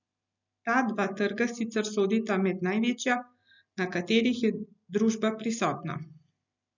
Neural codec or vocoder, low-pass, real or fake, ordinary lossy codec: none; 7.2 kHz; real; none